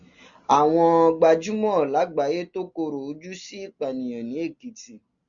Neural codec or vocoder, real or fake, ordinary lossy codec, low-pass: none; real; Opus, 64 kbps; 7.2 kHz